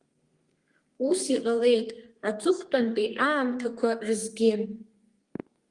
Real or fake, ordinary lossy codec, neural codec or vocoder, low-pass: fake; Opus, 24 kbps; codec, 32 kHz, 1.9 kbps, SNAC; 10.8 kHz